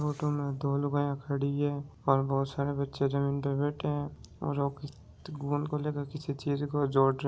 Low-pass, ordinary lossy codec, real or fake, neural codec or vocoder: none; none; real; none